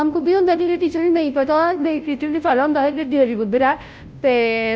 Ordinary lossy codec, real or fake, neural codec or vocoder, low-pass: none; fake; codec, 16 kHz, 0.5 kbps, FunCodec, trained on Chinese and English, 25 frames a second; none